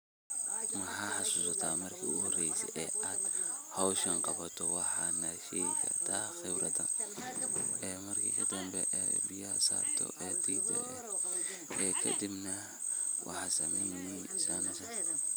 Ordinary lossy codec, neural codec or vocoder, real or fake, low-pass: none; none; real; none